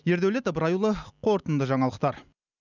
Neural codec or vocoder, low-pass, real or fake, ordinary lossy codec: none; 7.2 kHz; real; none